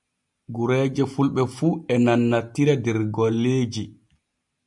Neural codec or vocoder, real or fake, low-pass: none; real; 10.8 kHz